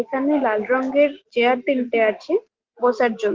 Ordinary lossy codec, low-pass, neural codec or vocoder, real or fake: Opus, 16 kbps; 7.2 kHz; none; real